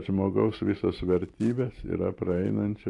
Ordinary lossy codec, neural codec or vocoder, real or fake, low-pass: AAC, 48 kbps; none; real; 10.8 kHz